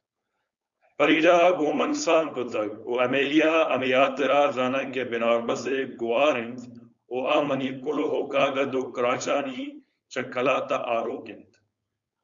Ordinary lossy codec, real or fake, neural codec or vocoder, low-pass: Opus, 64 kbps; fake; codec, 16 kHz, 4.8 kbps, FACodec; 7.2 kHz